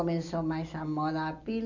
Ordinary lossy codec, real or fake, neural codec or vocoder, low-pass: none; real; none; 7.2 kHz